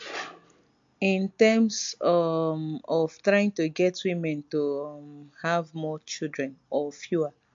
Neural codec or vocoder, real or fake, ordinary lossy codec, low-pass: none; real; MP3, 48 kbps; 7.2 kHz